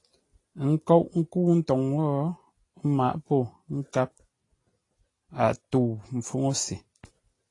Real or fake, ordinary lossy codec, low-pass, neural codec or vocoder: real; AAC, 32 kbps; 10.8 kHz; none